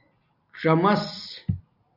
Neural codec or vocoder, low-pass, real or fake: none; 5.4 kHz; real